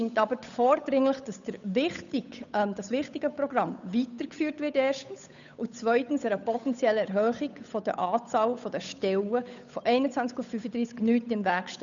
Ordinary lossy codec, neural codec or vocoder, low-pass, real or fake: none; codec, 16 kHz, 8 kbps, FunCodec, trained on Chinese and English, 25 frames a second; 7.2 kHz; fake